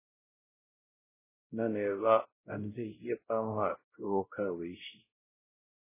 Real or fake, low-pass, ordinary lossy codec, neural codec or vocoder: fake; 3.6 kHz; MP3, 16 kbps; codec, 16 kHz, 0.5 kbps, X-Codec, WavLM features, trained on Multilingual LibriSpeech